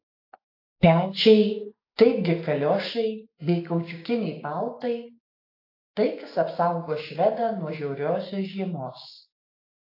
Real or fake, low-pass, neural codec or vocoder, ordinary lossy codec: fake; 5.4 kHz; codec, 16 kHz, 6 kbps, DAC; AAC, 32 kbps